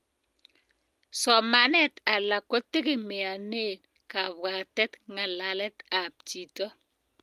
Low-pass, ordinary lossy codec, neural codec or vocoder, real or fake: 14.4 kHz; Opus, 32 kbps; none; real